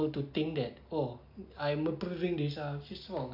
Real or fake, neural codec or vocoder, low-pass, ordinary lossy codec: real; none; 5.4 kHz; AAC, 48 kbps